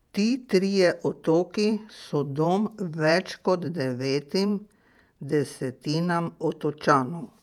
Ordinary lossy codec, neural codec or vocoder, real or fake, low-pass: none; vocoder, 48 kHz, 128 mel bands, Vocos; fake; 19.8 kHz